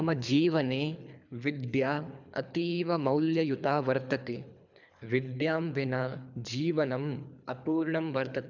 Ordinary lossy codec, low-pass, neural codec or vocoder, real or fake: none; 7.2 kHz; codec, 24 kHz, 3 kbps, HILCodec; fake